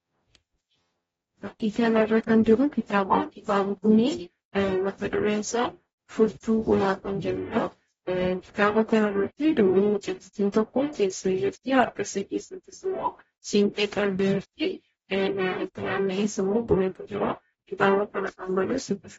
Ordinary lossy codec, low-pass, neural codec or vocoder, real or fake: AAC, 24 kbps; 19.8 kHz; codec, 44.1 kHz, 0.9 kbps, DAC; fake